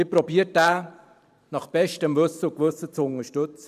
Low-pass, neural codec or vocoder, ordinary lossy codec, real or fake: 14.4 kHz; vocoder, 44.1 kHz, 128 mel bands every 512 samples, BigVGAN v2; none; fake